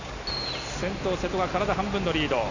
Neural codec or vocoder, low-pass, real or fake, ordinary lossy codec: none; 7.2 kHz; real; none